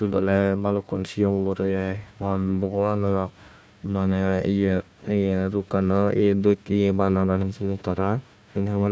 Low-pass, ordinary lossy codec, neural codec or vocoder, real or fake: none; none; codec, 16 kHz, 1 kbps, FunCodec, trained on Chinese and English, 50 frames a second; fake